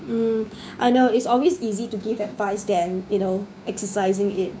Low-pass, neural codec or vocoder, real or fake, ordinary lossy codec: none; codec, 16 kHz, 6 kbps, DAC; fake; none